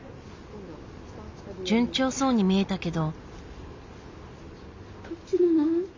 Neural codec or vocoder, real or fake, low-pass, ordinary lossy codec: none; real; 7.2 kHz; MP3, 64 kbps